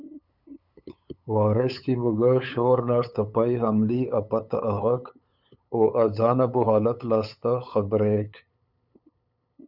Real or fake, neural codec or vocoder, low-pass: fake; codec, 16 kHz, 8 kbps, FunCodec, trained on LibriTTS, 25 frames a second; 5.4 kHz